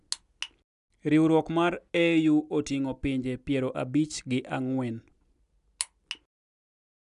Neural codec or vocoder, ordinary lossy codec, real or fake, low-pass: none; none; real; 10.8 kHz